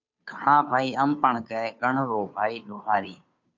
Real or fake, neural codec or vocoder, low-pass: fake; codec, 16 kHz, 2 kbps, FunCodec, trained on Chinese and English, 25 frames a second; 7.2 kHz